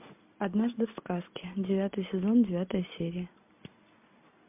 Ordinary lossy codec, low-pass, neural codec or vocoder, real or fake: MP3, 32 kbps; 3.6 kHz; none; real